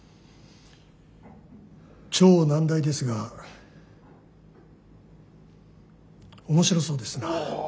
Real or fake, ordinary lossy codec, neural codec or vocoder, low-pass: real; none; none; none